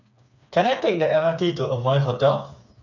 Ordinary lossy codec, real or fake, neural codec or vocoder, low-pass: none; fake; codec, 16 kHz, 4 kbps, FreqCodec, smaller model; 7.2 kHz